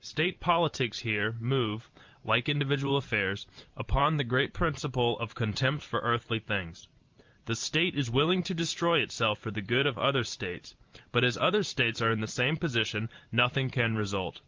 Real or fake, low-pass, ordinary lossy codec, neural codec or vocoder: fake; 7.2 kHz; Opus, 24 kbps; vocoder, 44.1 kHz, 128 mel bands every 512 samples, BigVGAN v2